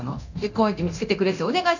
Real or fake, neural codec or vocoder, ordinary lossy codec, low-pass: fake; codec, 24 kHz, 0.9 kbps, DualCodec; none; 7.2 kHz